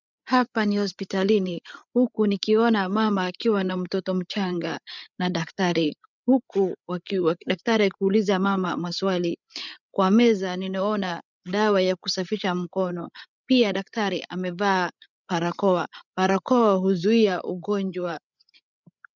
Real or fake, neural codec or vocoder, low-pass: fake; codec, 16 kHz, 8 kbps, FreqCodec, larger model; 7.2 kHz